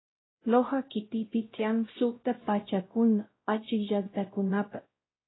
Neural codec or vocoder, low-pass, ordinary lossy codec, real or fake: codec, 16 kHz, 0.5 kbps, X-Codec, WavLM features, trained on Multilingual LibriSpeech; 7.2 kHz; AAC, 16 kbps; fake